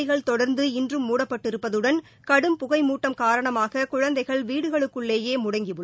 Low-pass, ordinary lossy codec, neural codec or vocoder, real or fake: none; none; none; real